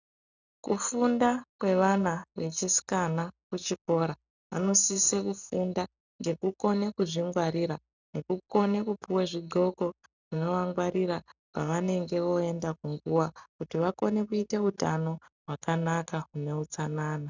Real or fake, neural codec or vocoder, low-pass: real; none; 7.2 kHz